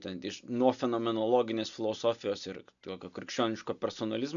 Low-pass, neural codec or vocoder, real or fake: 7.2 kHz; none; real